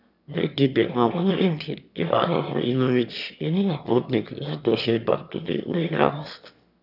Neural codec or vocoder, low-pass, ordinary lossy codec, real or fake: autoencoder, 22.05 kHz, a latent of 192 numbers a frame, VITS, trained on one speaker; 5.4 kHz; none; fake